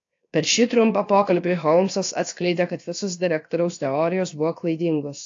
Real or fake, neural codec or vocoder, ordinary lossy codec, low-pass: fake; codec, 16 kHz, 0.7 kbps, FocalCodec; AAC, 48 kbps; 7.2 kHz